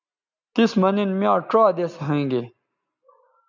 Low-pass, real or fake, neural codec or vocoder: 7.2 kHz; real; none